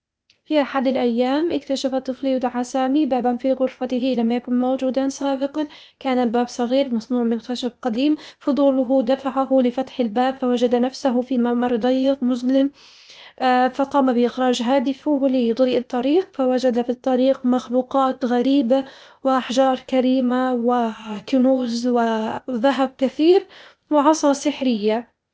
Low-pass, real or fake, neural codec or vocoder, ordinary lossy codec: none; fake; codec, 16 kHz, 0.8 kbps, ZipCodec; none